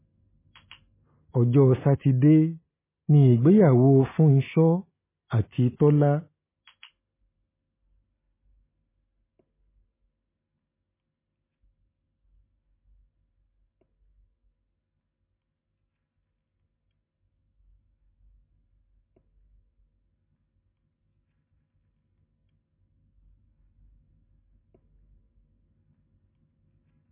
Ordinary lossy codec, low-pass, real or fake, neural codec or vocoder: MP3, 16 kbps; 3.6 kHz; real; none